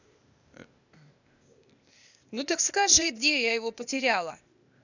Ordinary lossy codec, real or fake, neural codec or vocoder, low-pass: none; fake; codec, 16 kHz, 0.8 kbps, ZipCodec; 7.2 kHz